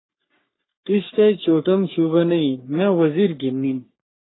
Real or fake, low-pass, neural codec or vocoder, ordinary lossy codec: fake; 7.2 kHz; codec, 44.1 kHz, 3.4 kbps, Pupu-Codec; AAC, 16 kbps